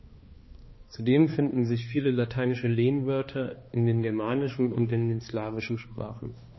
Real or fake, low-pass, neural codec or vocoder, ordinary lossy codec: fake; 7.2 kHz; codec, 16 kHz, 2 kbps, X-Codec, HuBERT features, trained on balanced general audio; MP3, 24 kbps